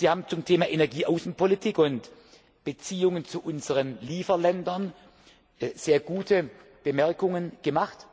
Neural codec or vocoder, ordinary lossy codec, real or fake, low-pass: none; none; real; none